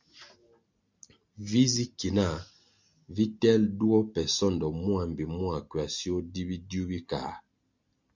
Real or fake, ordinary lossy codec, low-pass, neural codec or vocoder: real; MP3, 64 kbps; 7.2 kHz; none